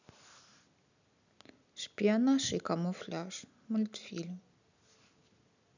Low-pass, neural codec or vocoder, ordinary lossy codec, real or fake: 7.2 kHz; none; none; real